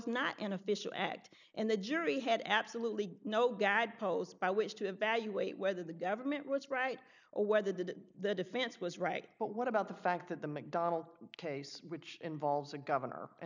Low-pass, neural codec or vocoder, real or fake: 7.2 kHz; none; real